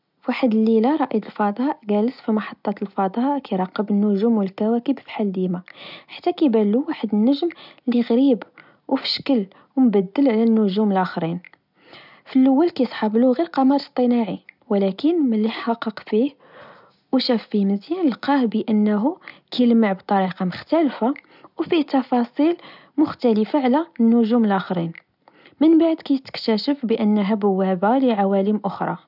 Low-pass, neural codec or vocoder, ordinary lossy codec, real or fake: 5.4 kHz; none; none; real